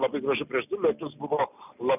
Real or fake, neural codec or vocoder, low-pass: real; none; 3.6 kHz